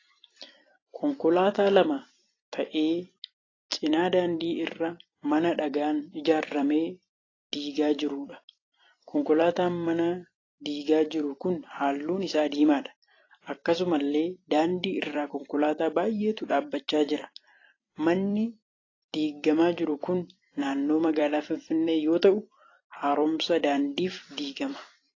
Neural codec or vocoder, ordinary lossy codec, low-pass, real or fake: vocoder, 44.1 kHz, 128 mel bands every 256 samples, BigVGAN v2; AAC, 32 kbps; 7.2 kHz; fake